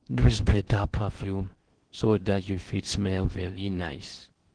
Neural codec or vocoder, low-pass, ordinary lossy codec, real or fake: codec, 16 kHz in and 24 kHz out, 0.6 kbps, FocalCodec, streaming, 4096 codes; 9.9 kHz; Opus, 16 kbps; fake